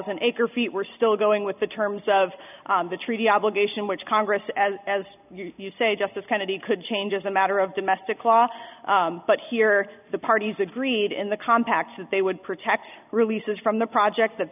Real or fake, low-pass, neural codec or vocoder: real; 3.6 kHz; none